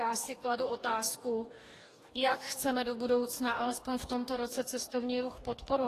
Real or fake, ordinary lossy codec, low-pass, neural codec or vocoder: fake; AAC, 48 kbps; 14.4 kHz; codec, 44.1 kHz, 2.6 kbps, DAC